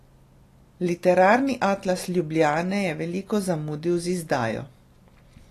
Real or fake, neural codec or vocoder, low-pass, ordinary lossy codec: real; none; 14.4 kHz; AAC, 48 kbps